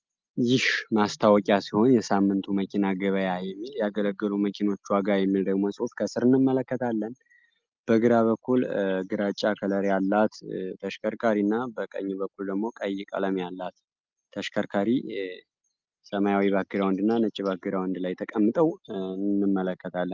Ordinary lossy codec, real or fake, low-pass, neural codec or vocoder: Opus, 24 kbps; real; 7.2 kHz; none